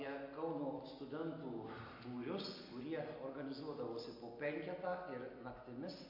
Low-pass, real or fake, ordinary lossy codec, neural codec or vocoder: 5.4 kHz; real; MP3, 32 kbps; none